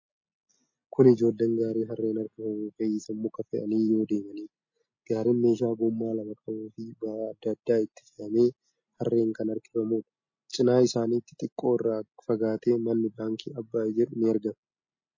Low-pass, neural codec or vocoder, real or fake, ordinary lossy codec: 7.2 kHz; none; real; MP3, 32 kbps